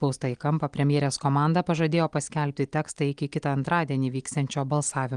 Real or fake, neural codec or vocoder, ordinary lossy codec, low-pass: real; none; Opus, 24 kbps; 9.9 kHz